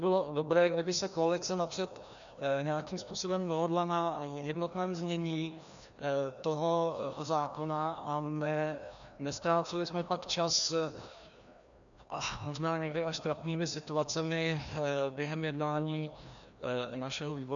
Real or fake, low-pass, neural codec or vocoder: fake; 7.2 kHz; codec, 16 kHz, 1 kbps, FreqCodec, larger model